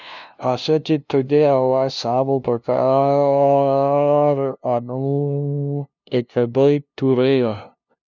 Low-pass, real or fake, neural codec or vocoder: 7.2 kHz; fake; codec, 16 kHz, 0.5 kbps, FunCodec, trained on LibriTTS, 25 frames a second